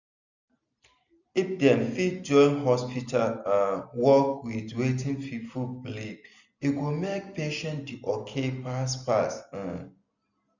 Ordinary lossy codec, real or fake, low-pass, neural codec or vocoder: none; real; 7.2 kHz; none